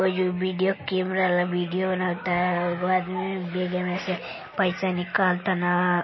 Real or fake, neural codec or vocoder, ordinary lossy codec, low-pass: real; none; MP3, 24 kbps; 7.2 kHz